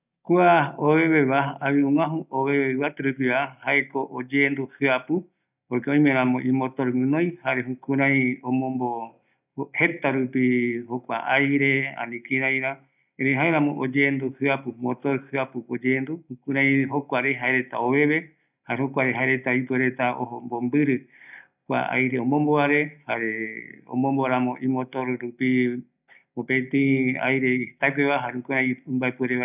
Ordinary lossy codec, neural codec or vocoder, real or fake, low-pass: none; none; real; 3.6 kHz